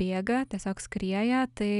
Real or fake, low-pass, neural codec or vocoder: real; 10.8 kHz; none